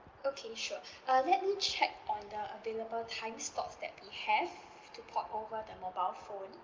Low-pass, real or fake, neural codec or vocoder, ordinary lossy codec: 7.2 kHz; real; none; Opus, 32 kbps